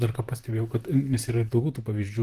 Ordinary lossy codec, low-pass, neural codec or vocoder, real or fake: Opus, 24 kbps; 14.4 kHz; vocoder, 44.1 kHz, 128 mel bands, Pupu-Vocoder; fake